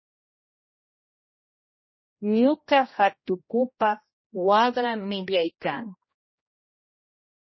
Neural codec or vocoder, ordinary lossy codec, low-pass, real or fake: codec, 16 kHz, 1 kbps, X-Codec, HuBERT features, trained on general audio; MP3, 24 kbps; 7.2 kHz; fake